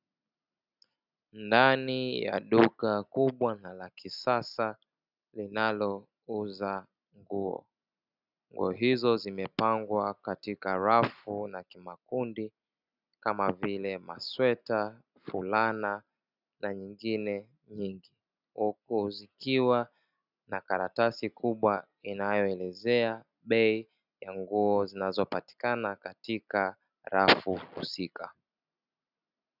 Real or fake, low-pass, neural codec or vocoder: real; 5.4 kHz; none